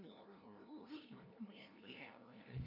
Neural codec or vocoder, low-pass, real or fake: codec, 16 kHz, 0.5 kbps, FunCodec, trained on LibriTTS, 25 frames a second; 5.4 kHz; fake